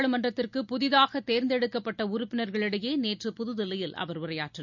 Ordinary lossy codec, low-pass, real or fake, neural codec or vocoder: none; 7.2 kHz; real; none